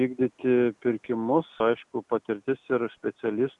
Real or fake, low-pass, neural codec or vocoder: real; 10.8 kHz; none